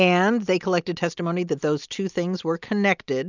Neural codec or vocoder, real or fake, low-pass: none; real; 7.2 kHz